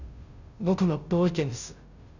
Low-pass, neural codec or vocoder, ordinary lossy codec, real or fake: 7.2 kHz; codec, 16 kHz, 0.5 kbps, FunCodec, trained on Chinese and English, 25 frames a second; none; fake